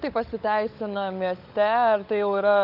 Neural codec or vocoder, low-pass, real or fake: codec, 16 kHz, 16 kbps, FunCodec, trained on LibriTTS, 50 frames a second; 5.4 kHz; fake